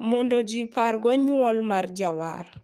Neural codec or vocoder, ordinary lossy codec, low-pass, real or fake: codec, 32 kHz, 1.9 kbps, SNAC; Opus, 32 kbps; 14.4 kHz; fake